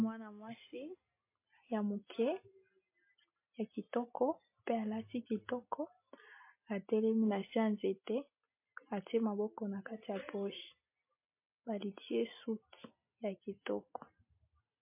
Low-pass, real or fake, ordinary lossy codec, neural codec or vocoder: 3.6 kHz; real; MP3, 24 kbps; none